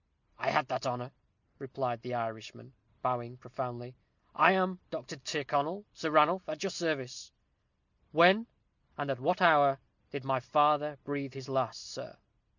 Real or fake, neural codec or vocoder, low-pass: real; none; 7.2 kHz